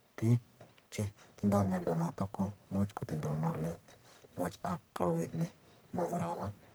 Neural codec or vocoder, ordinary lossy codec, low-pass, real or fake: codec, 44.1 kHz, 1.7 kbps, Pupu-Codec; none; none; fake